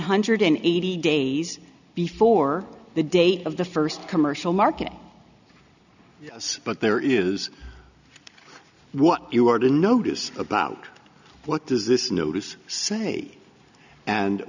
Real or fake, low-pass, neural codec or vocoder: real; 7.2 kHz; none